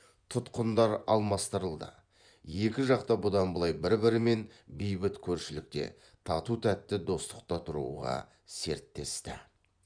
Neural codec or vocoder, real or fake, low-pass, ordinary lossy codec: vocoder, 24 kHz, 100 mel bands, Vocos; fake; 9.9 kHz; none